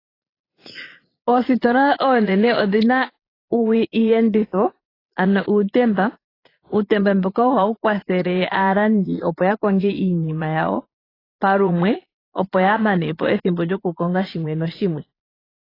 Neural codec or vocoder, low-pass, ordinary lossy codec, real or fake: vocoder, 22.05 kHz, 80 mel bands, Vocos; 5.4 kHz; AAC, 24 kbps; fake